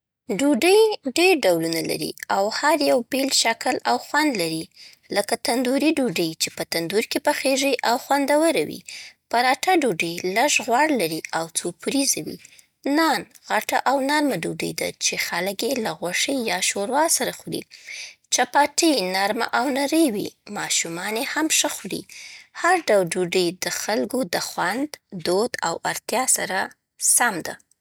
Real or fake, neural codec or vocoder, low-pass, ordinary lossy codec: real; none; none; none